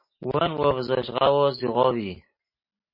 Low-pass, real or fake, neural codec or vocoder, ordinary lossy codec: 5.4 kHz; real; none; MP3, 24 kbps